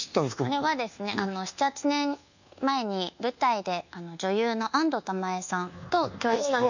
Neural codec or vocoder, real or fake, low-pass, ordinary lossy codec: codec, 24 kHz, 1.2 kbps, DualCodec; fake; 7.2 kHz; none